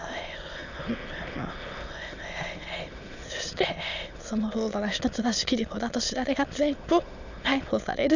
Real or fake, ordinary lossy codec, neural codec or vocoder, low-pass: fake; none; autoencoder, 22.05 kHz, a latent of 192 numbers a frame, VITS, trained on many speakers; 7.2 kHz